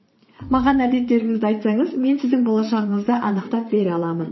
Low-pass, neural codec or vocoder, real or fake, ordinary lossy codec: 7.2 kHz; vocoder, 22.05 kHz, 80 mel bands, Vocos; fake; MP3, 24 kbps